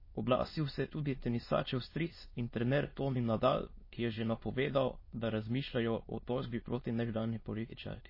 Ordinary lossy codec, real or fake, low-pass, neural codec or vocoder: MP3, 24 kbps; fake; 5.4 kHz; autoencoder, 22.05 kHz, a latent of 192 numbers a frame, VITS, trained on many speakers